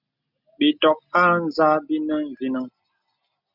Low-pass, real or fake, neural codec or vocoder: 5.4 kHz; real; none